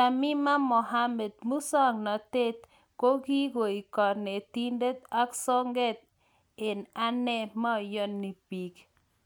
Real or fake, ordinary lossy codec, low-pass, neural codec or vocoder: real; none; none; none